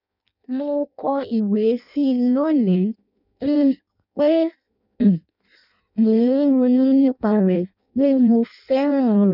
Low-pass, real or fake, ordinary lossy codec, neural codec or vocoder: 5.4 kHz; fake; none; codec, 16 kHz in and 24 kHz out, 0.6 kbps, FireRedTTS-2 codec